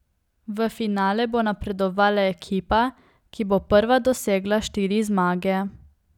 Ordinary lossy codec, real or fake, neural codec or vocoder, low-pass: none; real; none; 19.8 kHz